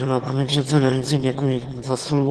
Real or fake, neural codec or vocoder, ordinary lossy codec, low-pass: fake; autoencoder, 22.05 kHz, a latent of 192 numbers a frame, VITS, trained on one speaker; Opus, 16 kbps; 9.9 kHz